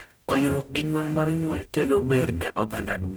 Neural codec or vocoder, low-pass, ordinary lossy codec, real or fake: codec, 44.1 kHz, 0.9 kbps, DAC; none; none; fake